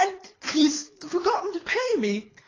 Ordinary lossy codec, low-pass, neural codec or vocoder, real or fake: AAC, 32 kbps; 7.2 kHz; codec, 24 kHz, 3 kbps, HILCodec; fake